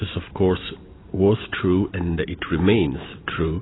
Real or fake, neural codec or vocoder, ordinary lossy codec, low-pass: real; none; AAC, 16 kbps; 7.2 kHz